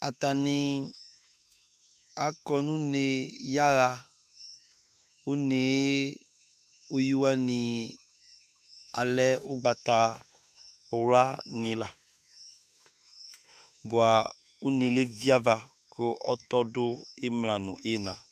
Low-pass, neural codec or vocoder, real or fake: 14.4 kHz; autoencoder, 48 kHz, 32 numbers a frame, DAC-VAE, trained on Japanese speech; fake